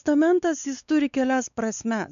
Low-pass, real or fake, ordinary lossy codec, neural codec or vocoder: 7.2 kHz; fake; MP3, 96 kbps; codec, 16 kHz, 4 kbps, X-Codec, WavLM features, trained on Multilingual LibriSpeech